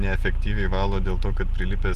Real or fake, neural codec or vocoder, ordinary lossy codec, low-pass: real; none; Opus, 24 kbps; 14.4 kHz